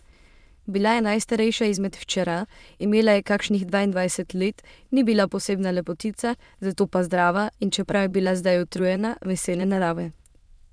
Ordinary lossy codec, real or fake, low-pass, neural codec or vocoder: none; fake; none; autoencoder, 22.05 kHz, a latent of 192 numbers a frame, VITS, trained on many speakers